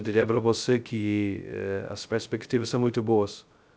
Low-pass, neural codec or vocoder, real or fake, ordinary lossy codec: none; codec, 16 kHz, 0.2 kbps, FocalCodec; fake; none